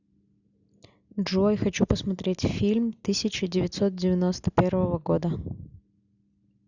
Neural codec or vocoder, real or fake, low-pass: none; real; 7.2 kHz